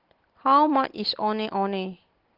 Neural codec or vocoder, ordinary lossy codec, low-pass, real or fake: none; Opus, 16 kbps; 5.4 kHz; real